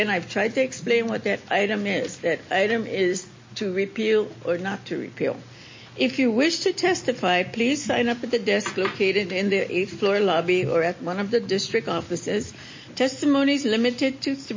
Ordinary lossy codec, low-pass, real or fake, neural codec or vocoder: MP3, 32 kbps; 7.2 kHz; real; none